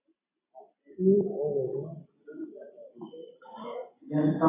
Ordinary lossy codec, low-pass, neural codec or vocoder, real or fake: AAC, 24 kbps; 3.6 kHz; none; real